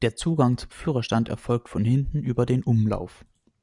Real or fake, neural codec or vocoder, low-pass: real; none; 10.8 kHz